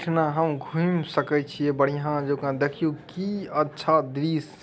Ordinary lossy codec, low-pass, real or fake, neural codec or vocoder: none; none; real; none